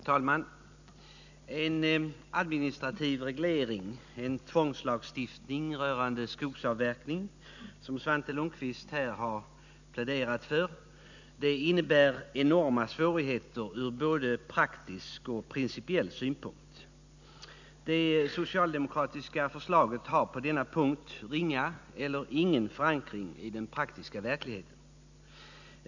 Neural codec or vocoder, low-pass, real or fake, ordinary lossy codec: none; 7.2 kHz; real; none